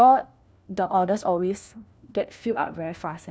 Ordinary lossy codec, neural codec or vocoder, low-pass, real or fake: none; codec, 16 kHz, 1 kbps, FunCodec, trained on LibriTTS, 50 frames a second; none; fake